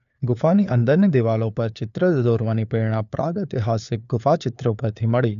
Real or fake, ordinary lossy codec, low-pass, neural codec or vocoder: fake; none; 7.2 kHz; codec, 16 kHz, 4 kbps, FunCodec, trained on LibriTTS, 50 frames a second